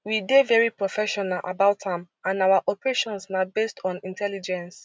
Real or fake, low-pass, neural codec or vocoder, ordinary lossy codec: real; none; none; none